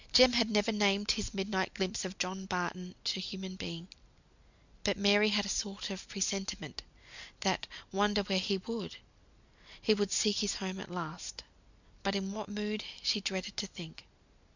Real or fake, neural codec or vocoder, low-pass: real; none; 7.2 kHz